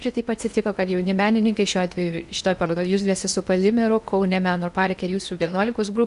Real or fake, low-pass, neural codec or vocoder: fake; 10.8 kHz; codec, 16 kHz in and 24 kHz out, 0.8 kbps, FocalCodec, streaming, 65536 codes